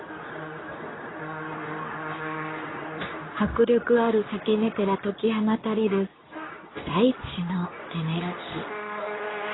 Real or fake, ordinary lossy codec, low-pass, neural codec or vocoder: fake; AAC, 16 kbps; 7.2 kHz; codec, 24 kHz, 0.9 kbps, WavTokenizer, medium speech release version 2